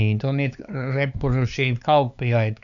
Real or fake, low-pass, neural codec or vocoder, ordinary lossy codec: fake; 7.2 kHz; codec, 16 kHz, 4 kbps, X-Codec, HuBERT features, trained on LibriSpeech; none